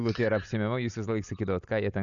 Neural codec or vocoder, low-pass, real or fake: none; 7.2 kHz; real